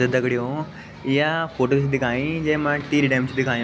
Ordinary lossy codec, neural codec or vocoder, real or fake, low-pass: none; none; real; none